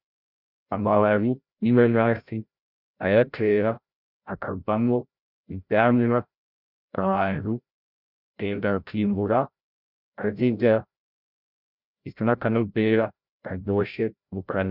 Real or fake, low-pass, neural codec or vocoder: fake; 5.4 kHz; codec, 16 kHz, 0.5 kbps, FreqCodec, larger model